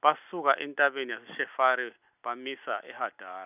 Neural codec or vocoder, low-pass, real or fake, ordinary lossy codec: none; 3.6 kHz; real; none